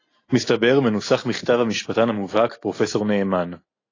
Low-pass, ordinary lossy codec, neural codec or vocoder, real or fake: 7.2 kHz; AAC, 32 kbps; none; real